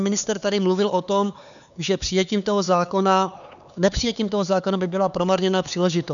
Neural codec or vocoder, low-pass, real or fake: codec, 16 kHz, 4 kbps, X-Codec, HuBERT features, trained on LibriSpeech; 7.2 kHz; fake